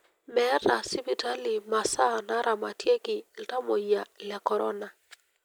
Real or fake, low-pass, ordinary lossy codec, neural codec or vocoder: real; none; none; none